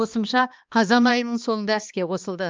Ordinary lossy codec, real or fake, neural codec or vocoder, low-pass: Opus, 24 kbps; fake; codec, 16 kHz, 2 kbps, X-Codec, HuBERT features, trained on balanced general audio; 7.2 kHz